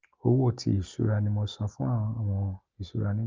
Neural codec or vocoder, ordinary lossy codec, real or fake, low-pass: none; Opus, 24 kbps; real; 7.2 kHz